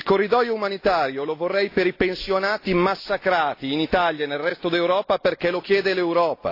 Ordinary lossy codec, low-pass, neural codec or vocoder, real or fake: AAC, 32 kbps; 5.4 kHz; none; real